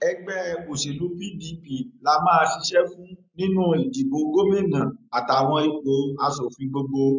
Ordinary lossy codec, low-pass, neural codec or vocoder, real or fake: MP3, 64 kbps; 7.2 kHz; none; real